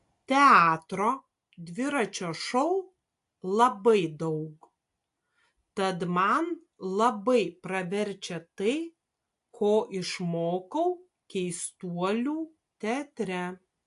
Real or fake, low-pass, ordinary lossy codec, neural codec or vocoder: real; 10.8 kHz; AAC, 64 kbps; none